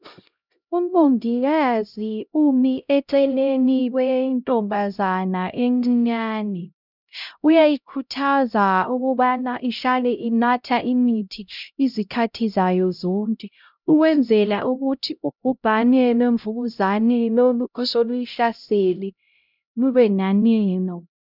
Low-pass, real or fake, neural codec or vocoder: 5.4 kHz; fake; codec, 16 kHz, 0.5 kbps, X-Codec, HuBERT features, trained on LibriSpeech